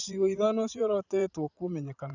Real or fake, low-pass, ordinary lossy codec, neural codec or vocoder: fake; 7.2 kHz; none; vocoder, 44.1 kHz, 80 mel bands, Vocos